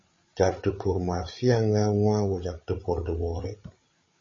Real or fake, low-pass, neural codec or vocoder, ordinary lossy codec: fake; 7.2 kHz; codec, 16 kHz, 8 kbps, FreqCodec, larger model; MP3, 32 kbps